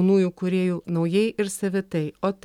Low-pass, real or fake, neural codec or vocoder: 19.8 kHz; real; none